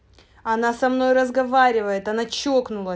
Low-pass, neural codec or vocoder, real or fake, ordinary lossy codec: none; none; real; none